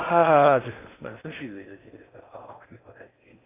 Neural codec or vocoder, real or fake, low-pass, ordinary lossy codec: codec, 16 kHz in and 24 kHz out, 0.6 kbps, FocalCodec, streaming, 4096 codes; fake; 3.6 kHz; AAC, 24 kbps